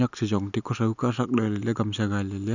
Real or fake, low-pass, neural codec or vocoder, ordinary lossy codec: real; 7.2 kHz; none; none